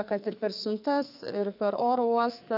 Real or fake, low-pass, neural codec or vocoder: fake; 5.4 kHz; codec, 44.1 kHz, 2.6 kbps, SNAC